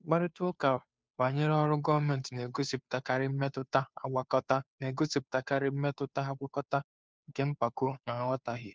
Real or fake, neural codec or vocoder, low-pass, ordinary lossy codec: fake; codec, 16 kHz, 2 kbps, FunCodec, trained on Chinese and English, 25 frames a second; none; none